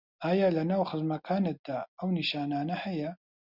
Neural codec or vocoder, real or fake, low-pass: none; real; 5.4 kHz